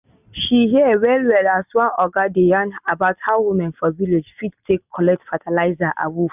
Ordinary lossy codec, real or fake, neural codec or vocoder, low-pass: none; real; none; 3.6 kHz